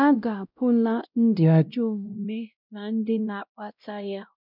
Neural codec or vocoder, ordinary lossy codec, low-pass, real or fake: codec, 16 kHz, 0.5 kbps, X-Codec, WavLM features, trained on Multilingual LibriSpeech; none; 5.4 kHz; fake